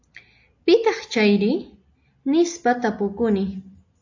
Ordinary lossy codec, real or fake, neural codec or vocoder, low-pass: AAC, 48 kbps; real; none; 7.2 kHz